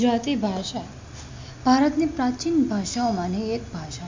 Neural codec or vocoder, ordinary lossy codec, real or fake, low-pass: none; MP3, 48 kbps; real; 7.2 kHz